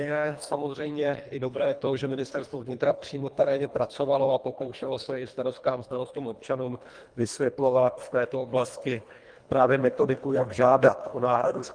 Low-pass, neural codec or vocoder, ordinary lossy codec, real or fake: 9.9 kHz; codec, 24 kHz, 1.5 kbps, HILCodec; Opus, 32 kbps; fake